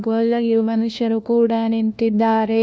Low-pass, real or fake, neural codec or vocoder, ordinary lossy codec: none; fake; codec, 16 kHz, 1 kbps, FunCodec, trained on LibriTTS, 50 frames a second; none